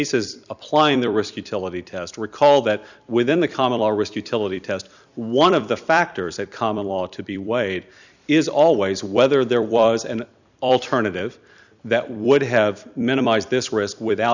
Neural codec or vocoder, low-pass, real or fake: vocoder, 44.1 kHz, 128 mel bands every 256 samples, BigVGAN v2; 7.2 kHz; fake